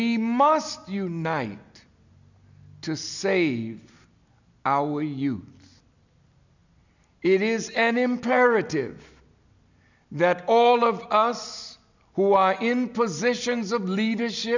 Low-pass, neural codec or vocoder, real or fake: 7.2 kHz; none; real